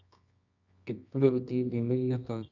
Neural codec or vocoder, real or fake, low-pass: codec, 24 kHz, 0.9 kbps, WavTokenizer, medium music audio release; fake; 7.2 kHz